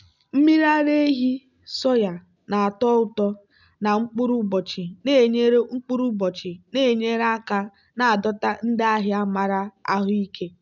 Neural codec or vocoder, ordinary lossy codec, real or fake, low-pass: none; none; real; 7.2 kHz